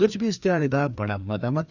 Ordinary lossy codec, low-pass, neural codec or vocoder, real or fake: none; 7.2 kHz; codec, 16 kHz, 2 kbps, FreqCodec, larger model; fake